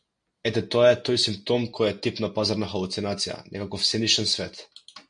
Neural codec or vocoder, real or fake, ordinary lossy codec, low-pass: none; real; MP3, 96 kbps; 9.9 kHz